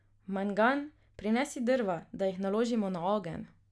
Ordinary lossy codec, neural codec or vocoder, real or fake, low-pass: none; none; real; none